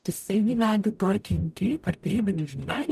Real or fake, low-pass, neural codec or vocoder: fake; 14.4 kHz; codec, 44.1 kHz, 0.9 kbps, DAC